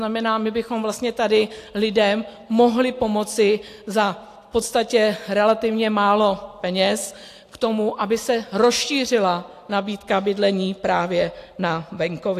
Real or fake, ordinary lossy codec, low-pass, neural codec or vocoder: real; AAC, 64 kbps; 14.4 kHz; none